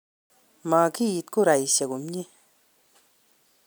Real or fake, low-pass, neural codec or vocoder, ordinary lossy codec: real; none; none; none